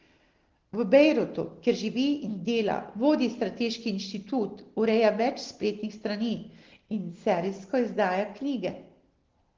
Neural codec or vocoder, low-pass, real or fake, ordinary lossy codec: none; 7.2 kHz; real; Opus, 16 kbps